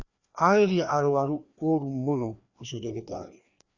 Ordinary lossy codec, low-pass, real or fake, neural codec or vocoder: Opus, 64 kbps; 7.2 kHz; fake; codec, 16 kHz, 2 kbps, FreqCodec, larger model